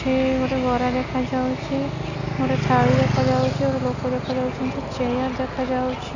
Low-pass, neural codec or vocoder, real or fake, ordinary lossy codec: 7.2 kHz; none; real; none